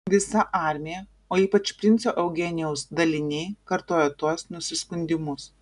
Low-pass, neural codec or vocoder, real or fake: 10.8 kHz; none; real